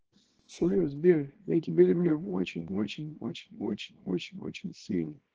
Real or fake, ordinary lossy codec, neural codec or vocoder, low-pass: fake; Opus, 16 kbps; codec, 24 kHz, 0.9 kbps, WavTokenizer, small release; 7.2 kHz